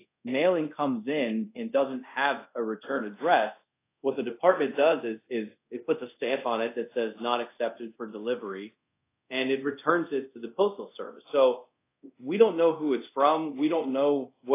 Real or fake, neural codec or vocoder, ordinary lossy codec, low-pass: fake; codec, 24 kHz, 0.5 kbps, DualCodec; AAC, 24 kbps; 3.6 kHz